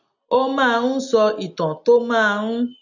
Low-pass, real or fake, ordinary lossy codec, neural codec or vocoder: 7.2 kHz; real; none; none